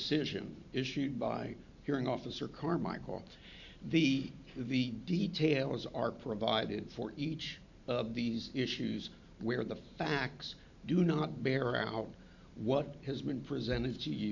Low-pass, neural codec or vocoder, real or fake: 7.2 kHz; vocoder, 44.1 kHz, 128 mel bands every 512 samples, BigVGAN v2; fake